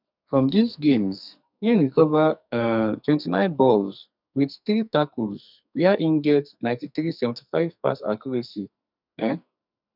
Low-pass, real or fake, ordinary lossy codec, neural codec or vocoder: 5.4 kHz; fake; none; codec, 44.1 kHz, 2.6 kbps, SNAC